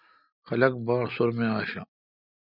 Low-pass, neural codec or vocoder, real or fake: 5.4 kHz; none; real